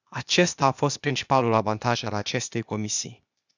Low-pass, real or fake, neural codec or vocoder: 7.2 kHz; fake; codec, 16 kHz, 0.8 kbps, ZipCodec